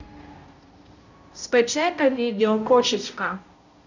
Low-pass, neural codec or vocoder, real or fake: 7.2 kHz; codec, 16 kHz, 0.5 kbps, X-Codec, HuBERT features, trained on balanced general audio; fake